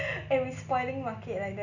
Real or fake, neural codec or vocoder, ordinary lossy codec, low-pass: real; none; none; 7.2 kHz